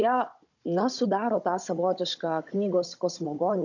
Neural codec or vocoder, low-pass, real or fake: codec, 16 kHz, 16 kbps, FunCodec, trained on Chinese and English, 50 frames a second; 7.2 kHz; fake